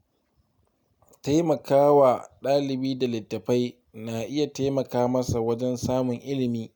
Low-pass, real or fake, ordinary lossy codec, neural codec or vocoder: none; real; none; none